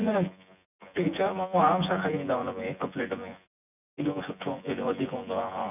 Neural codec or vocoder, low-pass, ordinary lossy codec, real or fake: vocoder, 24 kHz, 100 mel bands, Vocos; 3.6 kHz; none; fake